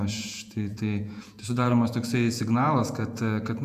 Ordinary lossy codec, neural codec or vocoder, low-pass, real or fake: AAC, 96 kbps; vocoder, 48 kHz, 128 mel bands, Vocos; 14.4 kHz; fake